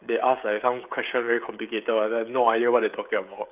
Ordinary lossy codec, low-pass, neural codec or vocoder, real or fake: none; 3.6 kHz; codec, 16 kHz, 16 kbps, FreqCodec, smaller model; fake